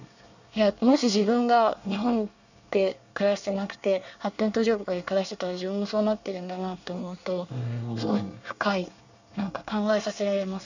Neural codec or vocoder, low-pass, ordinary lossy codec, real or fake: codec, 24 kHz, 1 kbps, SNAC; 7.2 kHz; none; fake